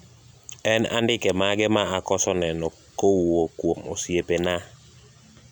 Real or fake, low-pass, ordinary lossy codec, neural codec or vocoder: real; 19.8 kHz; none; none